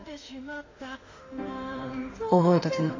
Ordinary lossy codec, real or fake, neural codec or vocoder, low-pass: AAC, 32 kbps; fake; autoencoder, 48 kHz, 32 numbers a frame, DAC-VAE, trained on Japanese speech; 7.2 kHz